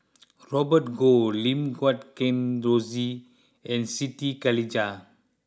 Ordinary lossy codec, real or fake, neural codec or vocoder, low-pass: none; real; none; none